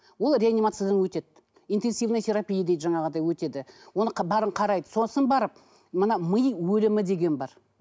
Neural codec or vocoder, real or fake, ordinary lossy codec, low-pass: none; real; none; none